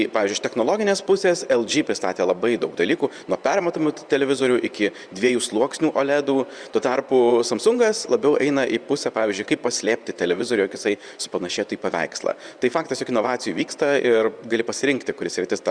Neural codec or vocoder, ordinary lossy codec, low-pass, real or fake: vocoder, 24 kHz, 100 mel bands, Vocos; Opus, 64 kbps; 9.9 kHz; fake